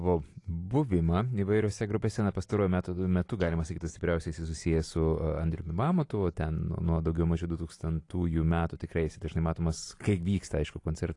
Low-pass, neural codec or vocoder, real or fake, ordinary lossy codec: 10.8 kHz; none; real; AAC, 48 kbps